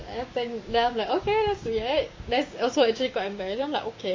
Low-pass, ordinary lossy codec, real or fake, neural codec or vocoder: 7.2 kHz; MP3, 32 kbps; fake; codec, 16 kHz, 6 kbps, DAC